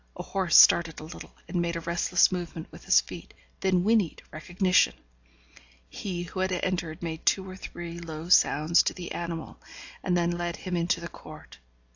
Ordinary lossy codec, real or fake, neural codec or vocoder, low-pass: AAC, 48 kbps; real; none; 7.2 kHz